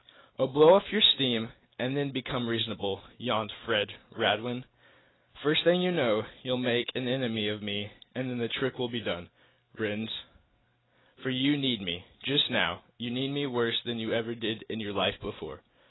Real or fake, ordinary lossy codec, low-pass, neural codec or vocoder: real; AAC, 16 kbps; 7.2 kHz; none